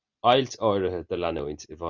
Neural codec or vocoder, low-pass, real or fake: none; 7.2 kHz; real